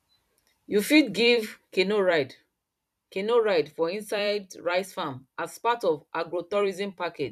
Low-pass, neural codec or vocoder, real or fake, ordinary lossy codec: 14.4 kHz; vocoder, 44.1 kHz, 128 mel bands every 256 samples, BigVGAN v2; fake; none